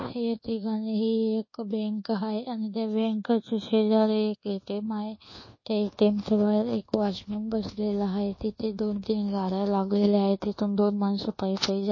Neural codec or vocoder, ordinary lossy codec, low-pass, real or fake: codec, 24 kHz, 1.2 kbps, DualCodec; MP3, 32 kbps; 7.2 kHz; fake